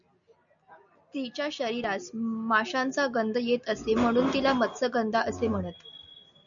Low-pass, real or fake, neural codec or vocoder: 7.2 kHz; real; none